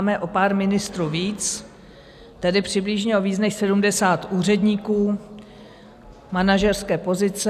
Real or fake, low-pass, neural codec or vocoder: real; 14.4 kHz; none